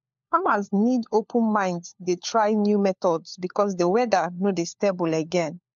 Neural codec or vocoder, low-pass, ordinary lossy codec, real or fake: codec, 16 kHz, 4 kbps, FunCodec, trained on LibriTTS, 50 frames a second; 7.2 kHz; MP3, 64 kbps; fake